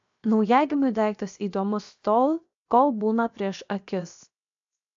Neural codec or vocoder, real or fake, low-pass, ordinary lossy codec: codec, 16 kHz, 0.7 kbps, FocalCodec; fake; 7.2 kHz; AAC, 64 kbps